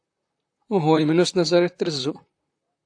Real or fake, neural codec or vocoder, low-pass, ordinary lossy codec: fake; vocoder, 44.1 kHz, 128 mel bands, Pupu-Vocoder; 9.9 kHz; AAC, 64 kbps